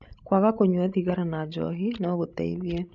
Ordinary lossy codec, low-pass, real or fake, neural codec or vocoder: none; 7.2 kHz; fake; codec, 16 kHz, 16 kbps, FreqCodec, larger model